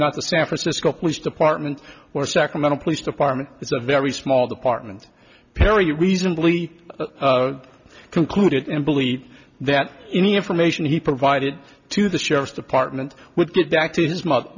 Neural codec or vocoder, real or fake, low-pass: none; real; 7.2 kHz